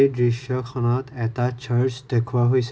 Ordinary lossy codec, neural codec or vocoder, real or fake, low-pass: none; none; real; none